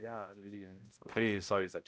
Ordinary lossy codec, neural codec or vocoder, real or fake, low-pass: none; codec, 16 kHz, 0.5 kbps, X-Codec, HuBERT features, trained on balanced general audio; fake; none